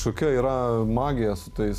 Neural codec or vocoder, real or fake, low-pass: none; real; 14.4 kHz